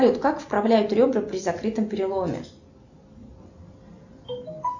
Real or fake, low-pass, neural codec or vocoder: real; 7.2 kHz; none